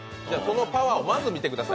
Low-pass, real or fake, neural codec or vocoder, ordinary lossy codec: none; real; none; none